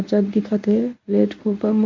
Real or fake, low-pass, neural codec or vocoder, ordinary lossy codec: fake; 7.2 kHz; codec, 24 kHz, 0.9 kbps, WavTokenizer, medium speech release version 1; MP3, 48 kbps